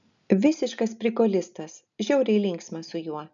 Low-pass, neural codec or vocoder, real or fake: 7.2 kHz; none; real